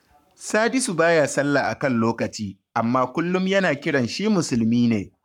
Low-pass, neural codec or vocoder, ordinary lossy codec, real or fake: 19.8 kHz; codec, 44.1 kHz, 7.8 kbps, Pupu-Codec; none; fake